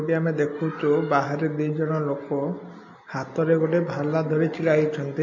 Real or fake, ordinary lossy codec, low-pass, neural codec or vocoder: real; MP3, 32 kbps; 7.2 kHz; none